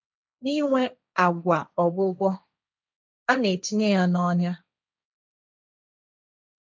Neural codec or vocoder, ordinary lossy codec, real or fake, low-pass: codec, 16 kHz, 1.1 kbps, Voila-Tokenizer; none; fake; none